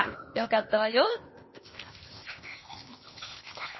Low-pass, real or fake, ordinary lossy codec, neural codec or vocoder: 7.2 kHz; fake; MP3, 24 kbps; codec, 16 kHz, 0.8 kbps, ZipCodec